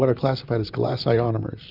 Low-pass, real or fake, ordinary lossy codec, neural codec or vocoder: 5.4 kHz; fake; Opus, 64 kbps; vocoder, 44.1 kHz, 128 mel bands, Pupu-Vocoder